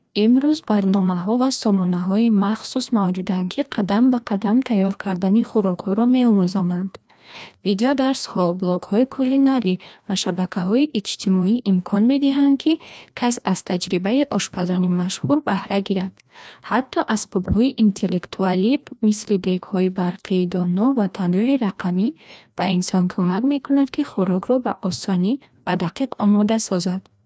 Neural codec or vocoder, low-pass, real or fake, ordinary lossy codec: codec, 16 kHz, 1 kbps, FreqCodec, larger model; none; fake; none